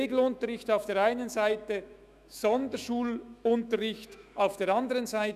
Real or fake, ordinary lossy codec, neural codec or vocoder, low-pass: fake; none; autoencoder, 48 kHz, 128 numbers a frame, DAC-VAE, trained on Japanese speech; 14.4 kHz